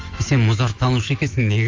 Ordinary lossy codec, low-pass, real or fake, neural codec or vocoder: Opus, 32 kbps; 7.2 kHz; fake; autoencoder, 48 kHz, 128 numbers a frame, DAC-VAE, trained on Japanese speech